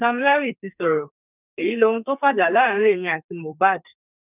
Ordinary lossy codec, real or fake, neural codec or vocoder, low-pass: none; fake; codec, 32 kHz, 1.9 kbps, SNAC; 3.6 kHz